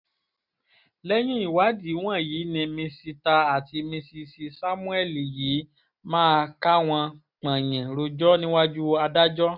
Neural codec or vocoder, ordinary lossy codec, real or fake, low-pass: none; none; real; 5.4 kHz